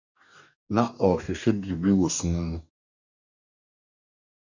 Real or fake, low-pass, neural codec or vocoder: fake; 7.2 kHz; codec, 44.1 kHz, 2.6 kbps, DAC